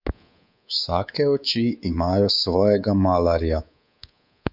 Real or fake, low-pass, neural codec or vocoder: fake; 5.4 kHz; codec, 24 kHz, 3.1 kbps, DualCodec